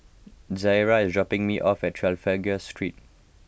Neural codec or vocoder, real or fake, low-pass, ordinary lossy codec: none; real; none; none